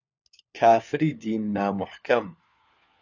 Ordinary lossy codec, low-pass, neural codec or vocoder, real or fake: Opus, 64 kbps; 7.2 kHz; codec, 16 kHz, 4 kbps, FunCodec, trained on LibriTTS, 50 frames a second; fake